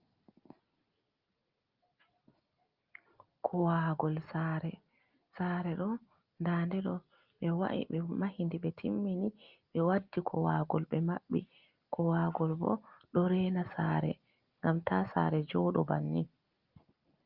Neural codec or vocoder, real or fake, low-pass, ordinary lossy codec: none; real; 5.4 kHz; Opus, 24 kbps